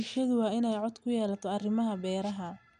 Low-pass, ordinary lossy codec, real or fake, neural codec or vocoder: 9.9 kHz; none; real; none